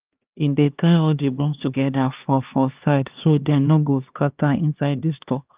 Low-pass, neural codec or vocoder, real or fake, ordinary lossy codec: 3.6 kHz; codec, 16 kHz, 2 kbps, X-Codec, HuBERT features, trained on LibriSpeech; fake; Opus, 24 kbps